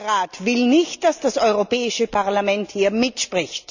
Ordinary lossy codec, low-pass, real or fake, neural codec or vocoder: none; 7.2 kHz; real; none